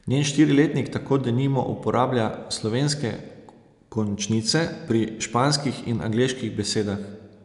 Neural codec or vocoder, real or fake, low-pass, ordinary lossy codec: none; real; 10.8 kHz; none